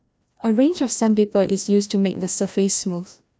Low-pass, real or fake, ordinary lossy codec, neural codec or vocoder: none; fake; none; codec, 16 kHz, 1 kbps, FreqCodec, larger model